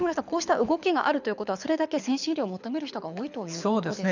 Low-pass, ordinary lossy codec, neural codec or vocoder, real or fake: 7.2 kHz; none; codec, 24 kHz, 6 kbps, HILCodec; fake